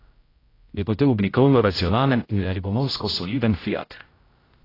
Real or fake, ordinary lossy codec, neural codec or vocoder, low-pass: fake; AAC, 24 kbps; codec, 16 kHz, 0.5 kbps, X-Codec, HuBERT features, trained on general audio; 5.4 kHz